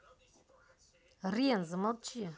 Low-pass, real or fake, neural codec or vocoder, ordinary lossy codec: none; real; none; none